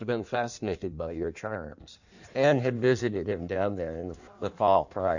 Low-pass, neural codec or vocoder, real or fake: 7.2 kHz; codec, 16 kHz in and 24 kHz out, 1.1 kbps, FireRedTTS-2 codec; fake